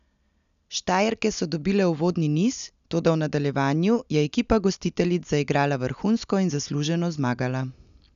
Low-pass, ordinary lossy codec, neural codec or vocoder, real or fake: 7.2 kHz; none; none; real